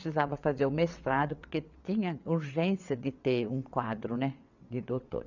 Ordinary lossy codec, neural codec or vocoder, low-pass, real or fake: none; vocoder, 22.05 kHz, 80 mel bands, WaveNeXt; 7.2 kHz; fake